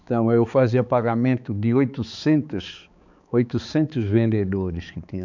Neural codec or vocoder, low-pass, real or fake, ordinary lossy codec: codec, 16 kHz, 4 kbps, X-Codec, HuBERT features, trained on balanced general audio; 7.2 kHz; fake; none